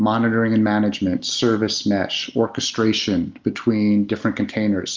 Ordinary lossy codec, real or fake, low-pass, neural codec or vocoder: Opus, 32 kbps; real; 7.2 kHz; none